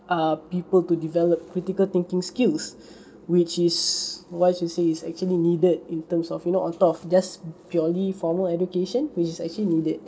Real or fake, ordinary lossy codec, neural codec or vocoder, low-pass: real; none; none; none